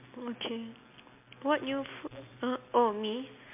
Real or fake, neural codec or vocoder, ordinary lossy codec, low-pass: real; none; MP3, 32 kbps; 3.6 kHz